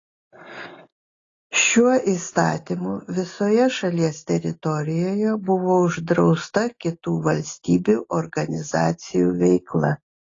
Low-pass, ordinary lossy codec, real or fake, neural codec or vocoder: 7.2 kHz; AAC, 32 kbps; real; none